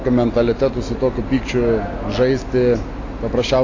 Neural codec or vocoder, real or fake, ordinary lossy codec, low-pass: none; real; AAC, 32 kbps; 7.2 kHz